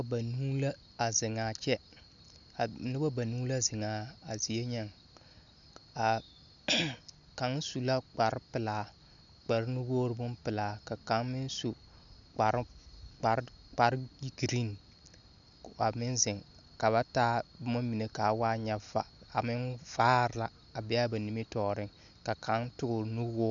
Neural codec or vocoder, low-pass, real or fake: none; 7.2 kHz; real